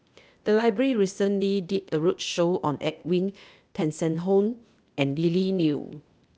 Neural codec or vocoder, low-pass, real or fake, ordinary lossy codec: codec, 16 kHz, 0.8 kbps, ZipCodec; none; fake; none